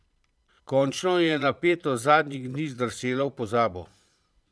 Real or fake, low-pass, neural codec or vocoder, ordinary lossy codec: fake; none; vocoder, 22.05 kHz, 80 mel bands, Vocos; none